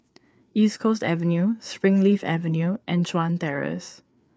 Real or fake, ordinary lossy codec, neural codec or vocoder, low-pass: fake; none; codec, 16 kHz, 4 kbps, FreqCodec, larger model; none